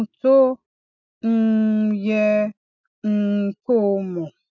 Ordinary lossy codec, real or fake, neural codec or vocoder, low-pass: none; real; none; 7.2 kHz